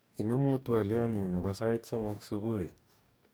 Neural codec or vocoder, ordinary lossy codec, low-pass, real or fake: codec, 44.1 kHz, 2.6 kbps, DAC; none; none; fake